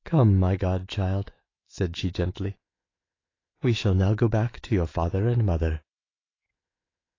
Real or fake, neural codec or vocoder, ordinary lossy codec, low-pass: fake; autoencoder, 48 kHz, 128 numbers a frame, DAC-VAE, trained on Japanese speech; AAC, 32 kbps; 7.2 kHz